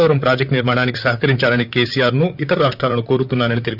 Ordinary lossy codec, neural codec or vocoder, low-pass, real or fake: none; vocoder, 44.1 kHz, 128 mel bands, Pupu-Vocoder; 5.4 kHz; fake